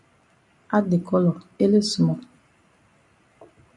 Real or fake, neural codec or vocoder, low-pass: real; none; 10.8 kHz